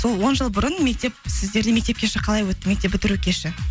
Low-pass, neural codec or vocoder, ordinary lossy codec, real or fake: none; none; none; real